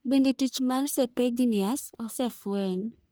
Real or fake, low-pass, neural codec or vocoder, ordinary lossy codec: fake; none; codec, 44.1 kHz, 1.7 kbps, Pupu-Codec; none